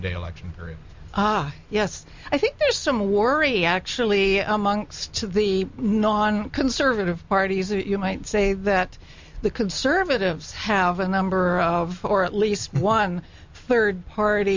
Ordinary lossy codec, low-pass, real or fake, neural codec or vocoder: MP3, 64 kbps; 7.2 kHz; real; none